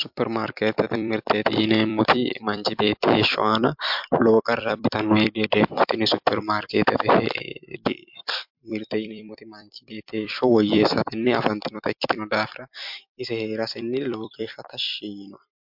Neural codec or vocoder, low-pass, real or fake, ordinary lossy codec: vocoder, 44.1 kHz, 128 mel bands every 256 samples, BigVGAN v2; 5.4 kHz; fake; MP3, 48 kbps